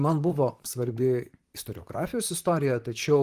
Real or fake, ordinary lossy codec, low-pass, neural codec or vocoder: fake; Opus, 24 kbps; 14.4 kHz; vocoder, 44.1 kHz, 128 mel bands, Pupu-Vocoder